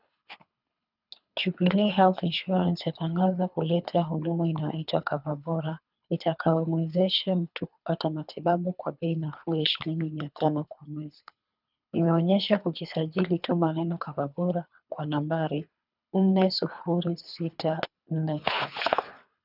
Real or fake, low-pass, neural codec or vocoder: fake; 5.4 kHz; codec, 24 kHz, 3 kbps, HILCodec